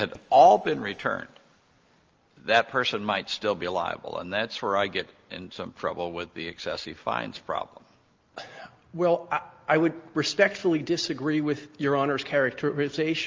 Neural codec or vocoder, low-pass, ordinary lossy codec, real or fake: none; 7.2 kHz; Opus, 24 kbps; real